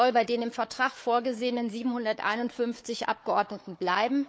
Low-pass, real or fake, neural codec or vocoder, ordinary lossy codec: none; fake; codec, 16 kHz, 4 kbps, FunCodec, trained on Chinese and English, 50 frames a second; none